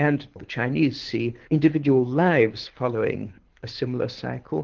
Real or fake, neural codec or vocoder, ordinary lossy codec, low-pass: fake; codec, 24 kHz, 6 kbps, HILCodec; Opus, 16 kbps; 7.2 kHz